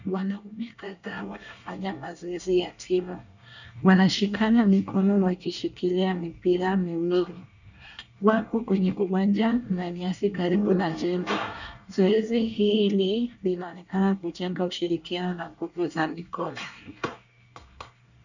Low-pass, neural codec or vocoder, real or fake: 7.2 kHz; codec, 24 kHz, 1 kbps, SNAC; fake